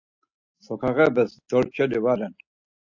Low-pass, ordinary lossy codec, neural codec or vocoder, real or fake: 7.2 kHz; AAC, 48 kbps; none; real